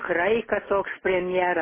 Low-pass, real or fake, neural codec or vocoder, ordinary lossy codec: 3.6 kHz; real; none; MP3, 16 kbps